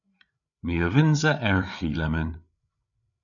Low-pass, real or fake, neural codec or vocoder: 7.2 kHz; fake; codec, 16 kHz, 8 kbps, FreqCodec, larger model